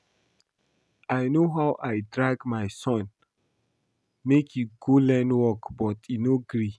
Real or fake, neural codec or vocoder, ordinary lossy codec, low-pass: real; none; none; none